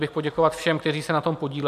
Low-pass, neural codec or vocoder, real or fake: 14.4 kHz; none; real